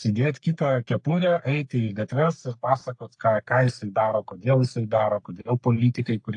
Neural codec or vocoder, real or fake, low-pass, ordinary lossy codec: codec, 44.1 kHz, 3.4 kbps, Pupu-Codec; fake; 10.8 kHz; AAC, 64 kbps